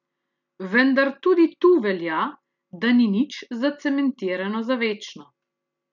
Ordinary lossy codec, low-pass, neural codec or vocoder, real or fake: none; 7.2 kHz; none; real